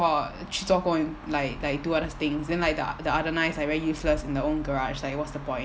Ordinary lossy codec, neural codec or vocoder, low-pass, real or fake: none; none; none; real